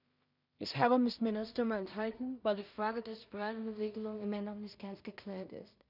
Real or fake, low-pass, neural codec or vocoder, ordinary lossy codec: fake; 5.4 kHz; codec, 16 kHz in and 24 kHz out, 0.4 kbps, LongCat-Audio-Codec, two codebook decoder; none